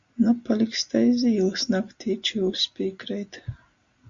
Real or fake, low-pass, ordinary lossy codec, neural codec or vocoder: real; 7.2 kHz; Opus, 64 kbps; none